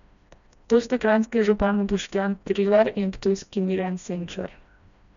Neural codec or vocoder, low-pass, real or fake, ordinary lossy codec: codec, 16 kHz, 1 kbps, FreqCodec, smaller model; 7.2 kHz; fake; none